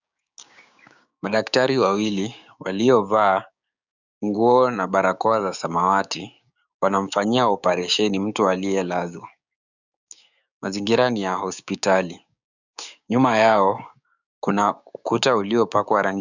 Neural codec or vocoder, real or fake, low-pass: codec, 16 kHz, 6 kbps, DAC; fake; 7.2 kHz